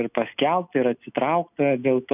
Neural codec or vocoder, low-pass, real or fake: none; 3.6 kHz; real